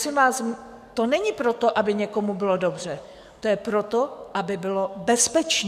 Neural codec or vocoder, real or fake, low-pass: codec, 44.1 kHz, 7.8 kbps, DAC; fake; 14.4 kHz